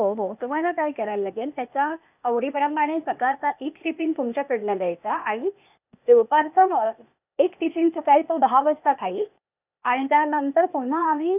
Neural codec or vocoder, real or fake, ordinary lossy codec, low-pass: codec, 16 kHz, 0.8 kbps, ZipCodec; fake; none; 3.6 kHz